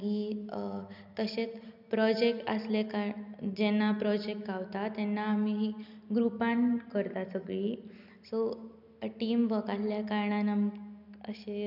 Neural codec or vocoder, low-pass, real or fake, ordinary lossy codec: none; 5.4 kHz; real; none